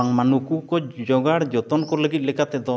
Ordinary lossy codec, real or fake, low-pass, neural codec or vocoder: none; real; none; none